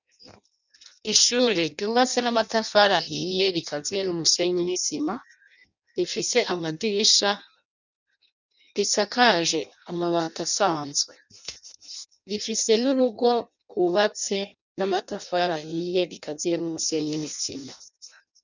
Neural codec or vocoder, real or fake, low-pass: codec, 16 kHz in and 24 kHz out, 0.6 kbps, FireRedTTS-2 codec; fake; 7.2 kHz